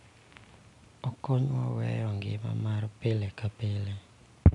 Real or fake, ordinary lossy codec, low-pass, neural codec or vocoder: real; none; 10.8 kHz; none